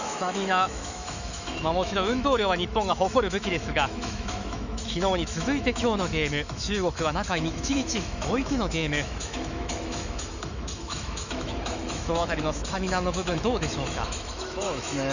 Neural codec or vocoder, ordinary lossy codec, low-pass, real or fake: autoencoder, 48 kHz, 128 numbers a frame, DAC-VAE, trained on Japanese speech; none; 7.2 kHz; fake